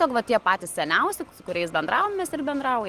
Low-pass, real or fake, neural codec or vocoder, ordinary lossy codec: 14.4 kHz; real; none; Opus, 24 kbps